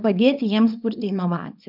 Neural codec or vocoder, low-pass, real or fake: codec, 24 kHz, 0.9 kbps, WavTokenizer, small release; 5.4 kHz; fake